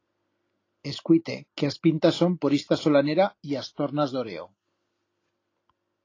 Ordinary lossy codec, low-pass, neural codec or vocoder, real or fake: AAC, 32 kbps; 7.2 kHz; none; real